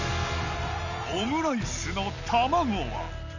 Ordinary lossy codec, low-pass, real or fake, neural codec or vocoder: none; 7.2 kHz; real; none